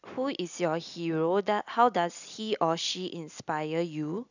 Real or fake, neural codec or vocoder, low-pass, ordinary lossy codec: real; none; 7.2 kHz; none